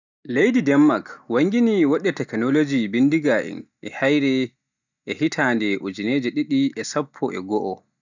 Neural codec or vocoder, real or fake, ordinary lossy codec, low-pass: none; real; none; 7.2 kHz